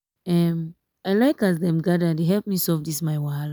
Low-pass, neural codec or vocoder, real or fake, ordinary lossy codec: none; none; real; none